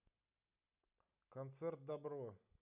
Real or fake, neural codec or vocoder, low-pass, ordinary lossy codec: real; none; 3.6 kHz; none